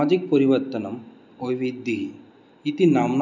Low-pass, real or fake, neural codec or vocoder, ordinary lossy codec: 7.2 kHz; real; none; none